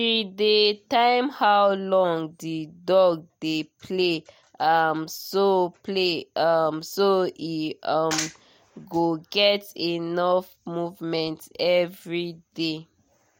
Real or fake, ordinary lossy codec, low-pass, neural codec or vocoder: real; MP3, 64 kbps; 19.8 kHz; none